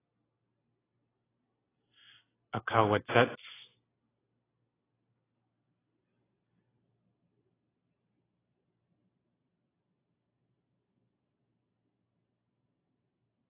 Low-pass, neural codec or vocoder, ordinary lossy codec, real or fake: 3.6 kHz; none; AAC, 16 kbps; real